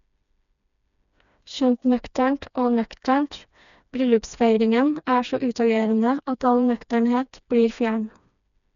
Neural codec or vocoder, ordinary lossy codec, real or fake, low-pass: codec, 16 kHz, 2 kbps, FreqCodec, smaller model; none; fake; 7.2 kHz